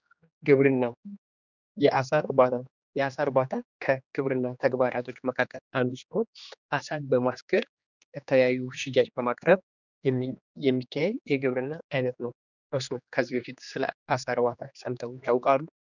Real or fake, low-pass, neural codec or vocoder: fake; 7.2 kHz; codec, 16 kHz, 2 kbps, X-Codec, HuBERT features, trained on general audio